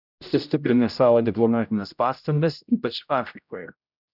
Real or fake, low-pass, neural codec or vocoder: fake; 5.4 kHz; codec, 16 kHz, 0.5 kbps, X-Codec, HuBERT features, trained on general audio